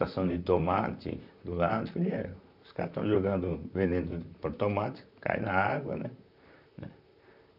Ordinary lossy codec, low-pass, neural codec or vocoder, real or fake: none; 5.4 kHz; vocoder, 44.1 kHz, 128 mel bands, Pupu-Vocoder; fake